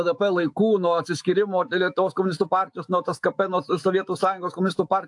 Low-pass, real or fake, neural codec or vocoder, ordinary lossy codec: 10.8 kHz; fake; autoencoder, 48 kHz, 128 numbers a frame, DAC-VAE, trained on Japanese speech; AAC, 64 kbps